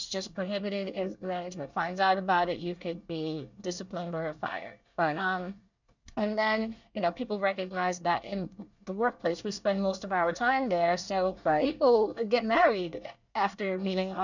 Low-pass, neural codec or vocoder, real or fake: 7.2 kHz; codec, 24 kHz, 1 kbps, SNAC; fake